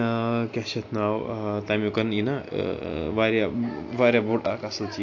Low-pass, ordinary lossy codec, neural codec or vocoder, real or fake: 7.2 kHz; AAC, 48 kbps; none; real